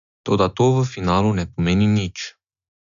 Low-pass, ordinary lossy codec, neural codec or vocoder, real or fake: 7.2 kHz; none; none; real